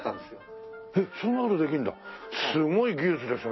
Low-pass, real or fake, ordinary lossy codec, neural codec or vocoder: 7.2 kHz; real; MP3, 24 kbps; none